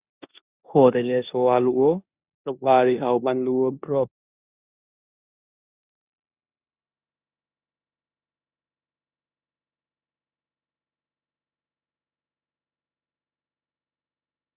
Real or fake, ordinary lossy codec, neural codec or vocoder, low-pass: fake; Opus, 64 kbps; codec, 16 kHz in and 24 kHz out, 0.9 kbps, LongCat-Audio-Codec, four codebook decoder; 3.6 kHz